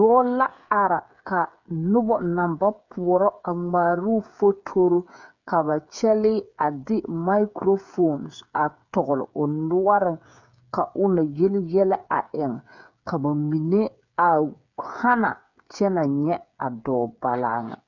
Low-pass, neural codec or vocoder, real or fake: 7.2 kHz; codec, 16 kHz, 8 kbps, FreqCodec, smaller model; fake